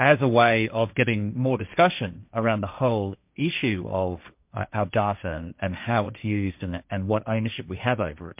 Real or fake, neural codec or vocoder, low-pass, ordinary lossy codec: fake; codec, 16 kHz, 1.1 kbps, Voila-Tokenizer; 3.6 kHz; MP3, 32 kbps